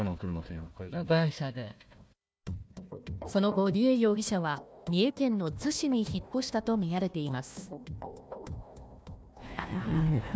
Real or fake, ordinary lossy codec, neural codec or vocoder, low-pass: fake; none; codec, 16 kHz, 1 kbps, FunCodec, trained on Chinese and English, 50 frames a second; none